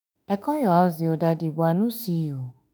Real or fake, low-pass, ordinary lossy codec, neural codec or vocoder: fake; none; none; autoencoder, 48 kHz, 32 numbers a frame, DAC-VAE, trained on Japanese speech